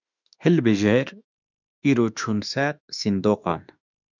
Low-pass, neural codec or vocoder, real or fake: 7.2 kHz; autoencoder, 48 kHz, 32 numbers a frame, DAC-VAE, trained on Japanese speech; fake